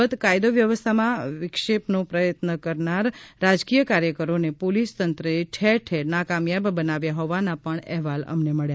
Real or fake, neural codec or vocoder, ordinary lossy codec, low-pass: real; none; none; none